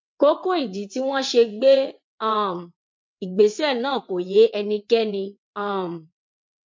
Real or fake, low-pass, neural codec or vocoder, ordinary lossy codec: fake; 7.2 kHz; vocoder, 22.05 kHz, 80 mel bands, WaveNeXt; MP3, 48 kbps